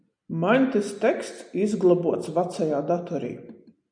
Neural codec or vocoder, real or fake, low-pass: none; real; 9.9 kHz